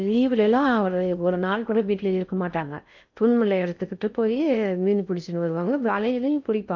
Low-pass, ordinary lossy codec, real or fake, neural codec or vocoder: 7.2 kHz; none; fake; codec, 16 kHz in and 24 kHz out, 0.6 kbps, FocalCodec, streaming, 4096 codes